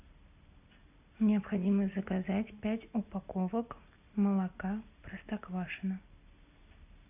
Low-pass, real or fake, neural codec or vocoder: 3.6 kHz; real; none